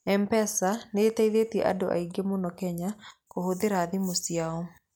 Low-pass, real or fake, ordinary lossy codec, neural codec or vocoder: none; real; none; none